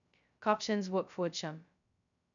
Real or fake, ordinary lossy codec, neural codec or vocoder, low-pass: fake; none; codec, 16 kHz, 0.2 kbps, FocalCodec; 7.2 kHz